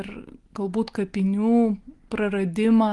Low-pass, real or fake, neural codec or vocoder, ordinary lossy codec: 10.8 kHz; real; none; Opus, 24 kbps